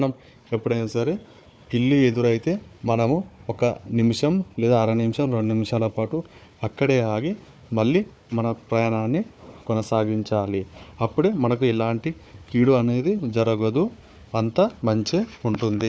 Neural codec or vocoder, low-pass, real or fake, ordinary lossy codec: codec, 16 kHz, 4 kbps, FunCodec, trained on Chinese and English, 50 frames a second; none; fake; none